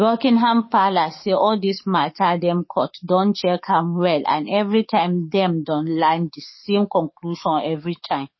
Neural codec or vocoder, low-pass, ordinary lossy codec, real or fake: codec, 44.1 kHz, 7.8 kbps, DAC; 7.2 kHz; MP3, 24 kbps; fake